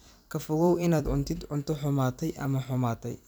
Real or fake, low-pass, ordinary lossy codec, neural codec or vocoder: fake; none; none; vocoder, 44.1 kHz, 128 mel bands every 256 samples, BigVGAN v2